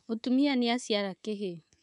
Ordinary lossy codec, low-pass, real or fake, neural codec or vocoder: none; 10.8 kHz; fake; codec, 24 kHz, 3.1 kbps, DualCodec